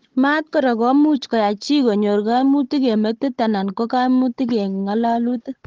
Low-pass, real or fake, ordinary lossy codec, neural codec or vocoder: 7.2 kHz; fake; Opus, 16 kbps; codec, 16 kHz, 16 kbps, FunCodec, trained on Chinese and English, 50 frames a second